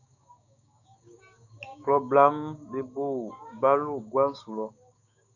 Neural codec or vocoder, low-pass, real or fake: codec, 16 kHz, 6 kbps, DAC; 7.2 kHz; fake